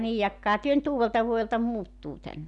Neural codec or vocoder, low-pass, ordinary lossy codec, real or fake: none; 10.8 kHz; none; real